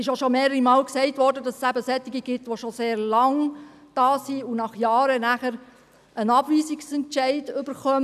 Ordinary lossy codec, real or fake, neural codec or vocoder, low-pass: none; real; none; 14.4 kHz